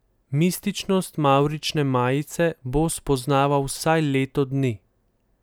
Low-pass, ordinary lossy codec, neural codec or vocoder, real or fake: none; none; none; real